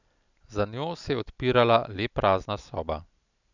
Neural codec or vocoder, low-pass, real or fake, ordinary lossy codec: none; 7.2 kHz; real; none